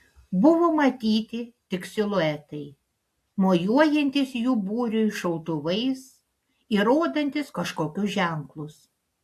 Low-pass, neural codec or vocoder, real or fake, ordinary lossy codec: 14.4 kHz; none; real; AAC, 64 kbps